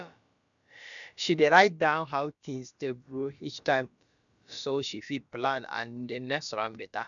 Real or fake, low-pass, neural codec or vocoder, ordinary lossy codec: fake; 7.2 kHz; codec, 16 kHz, about 1 kbps, DyCAST, with the encoder's durations; none